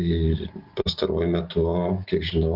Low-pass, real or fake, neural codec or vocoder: 5.4 kHz; real; none